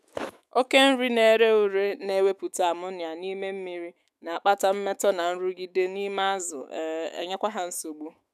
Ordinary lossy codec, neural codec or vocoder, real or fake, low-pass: none; autoencoder, 48 kHz, 128 numbers a frame, DAC-VAE, trained on Japanese speech; fake; 14.4 kHz